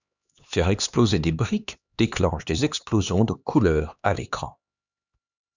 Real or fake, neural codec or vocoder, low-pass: fake; codec, 16 kHz, 2 kbps, X-Codec, HuBERT features, trained on LibriSpeech; 7.2 kHz